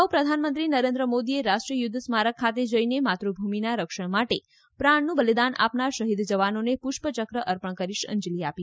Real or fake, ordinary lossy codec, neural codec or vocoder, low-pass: real; none; none; none